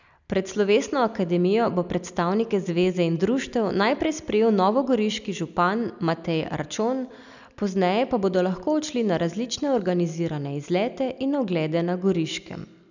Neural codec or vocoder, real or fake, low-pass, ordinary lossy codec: none; real; 7.2 kHz; none